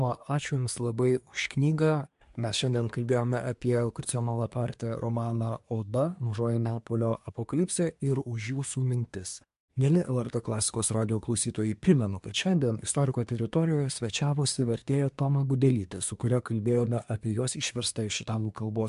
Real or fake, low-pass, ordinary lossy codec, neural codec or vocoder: fake; 10.8 kHz; MP3, 64 kbps; codec, 24 kHz, 1 kbps, SNAC